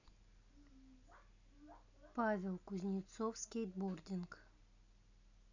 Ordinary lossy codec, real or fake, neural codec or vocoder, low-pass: none; real; none; 7.2 kHz